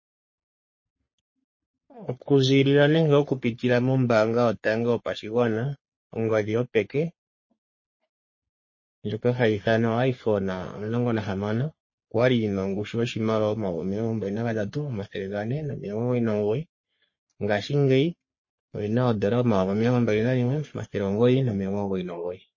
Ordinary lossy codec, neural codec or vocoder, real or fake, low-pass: MP3, 32 kbps; codec, 44.1 kHz, 3.4 kbps, Pupu-Codec; fake; 7.2 kHz